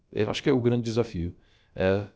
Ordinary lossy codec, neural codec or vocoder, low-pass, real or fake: none; codec, 16 kHz, about 1 kbps, DyCAST, with the encoder's durations; none; fake